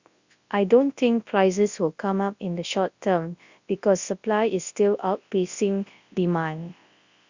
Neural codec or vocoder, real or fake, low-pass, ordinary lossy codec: codec, 24 kHz, 0.9 kbps, WavTokenizer, large speech release; fake; 7.2 kHz; Opus, 64 kbps